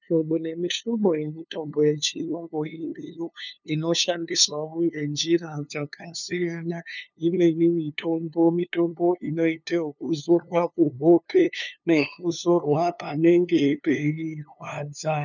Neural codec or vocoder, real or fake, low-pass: codec, 16 kHz, 2 kbps, FunCodec, trained on LibriTTS, 25 frames a second; fake; 7.2 kHz